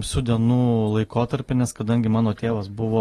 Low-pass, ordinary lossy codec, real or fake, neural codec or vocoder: 14.4 kHz; AAC, 32 kbps; real; none